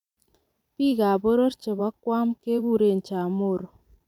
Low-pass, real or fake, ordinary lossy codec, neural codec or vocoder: 19.8 kHz; fake; none; vocoder, 44.1 kHz, 128 mel bands every 512 samples, BigVGAN v2